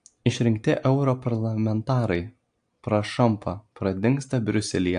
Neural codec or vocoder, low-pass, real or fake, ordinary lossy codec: none; 9.9 kHz; real; AAC, 64 kbps